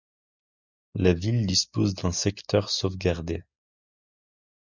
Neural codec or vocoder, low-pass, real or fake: none; 7.2 kHz; real